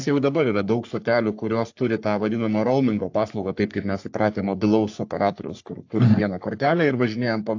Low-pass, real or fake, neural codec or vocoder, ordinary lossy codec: 7.2 kHz; fake; codec, 44.1 kHz, 3.4 kbps, Pupu-Codec; AAC, 48 kbps